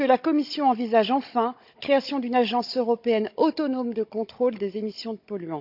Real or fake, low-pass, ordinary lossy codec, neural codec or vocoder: fake; 5.4 kHz; none; codec, 16 kHz, 16 kbps, FunCodec, trained on Chinese and English, 50 frames a second